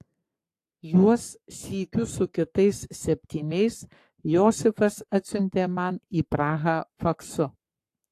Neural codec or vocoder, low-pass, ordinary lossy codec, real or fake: codec, 44.1 kHz, 7.8 kbps, Pupu-Codec; 14.4 kHz; AAC, 64 kbps; fake